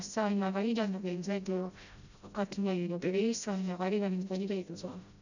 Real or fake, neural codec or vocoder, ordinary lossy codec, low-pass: fake; codec, 16 kHz, 0.5 kbps, FreqCodec, smaller model; none; 7.2 kHz